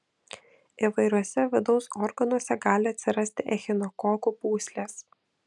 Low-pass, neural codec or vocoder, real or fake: 10.8 kHz; none; real